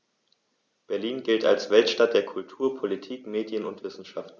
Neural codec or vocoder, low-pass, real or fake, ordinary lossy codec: none; 7.2 kHz; real; none